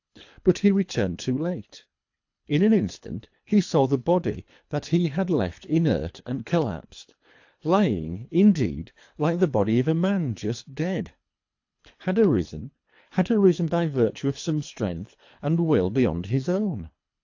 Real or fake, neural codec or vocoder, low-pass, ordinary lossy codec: fake; codec, 24 kHz, 3 kbps, HILCodec; 7.2 kHz; AAC, 48 kbps